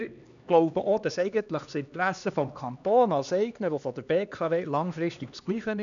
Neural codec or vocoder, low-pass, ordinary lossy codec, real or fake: codec, 16 kHz, 2 kbps, X-Codec, HuBERT features, trained on LibriSpeech; 7.2 kHz; none; fake